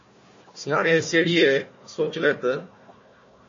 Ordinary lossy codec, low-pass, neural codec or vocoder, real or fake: MP3, 32 kbps; 7.2 kHz; codec, 16 kHz, 1 kbps, FunCodec, trained on Chinese and English, 50 frames a second; fake